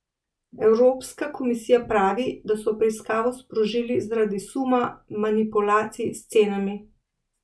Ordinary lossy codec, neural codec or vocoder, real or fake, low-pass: none; none; real; none